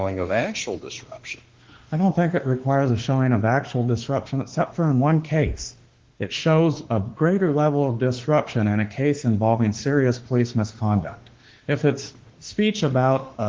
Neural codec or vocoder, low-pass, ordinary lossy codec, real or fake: autoencoder, 48 kHz, 32 numbers a frame, DAC-VAE, trained on Japanese speech; 7.2 kHz; Opus, 16 kbps; fake